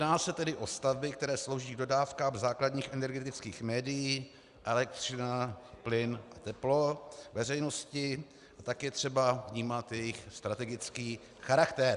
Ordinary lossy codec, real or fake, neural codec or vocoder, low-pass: Opus, 64 kbps; real; none; 10.8 kHz